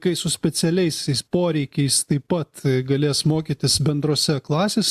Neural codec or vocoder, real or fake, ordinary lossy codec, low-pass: none; real; AAC, 96 kbps; 14.4 kHz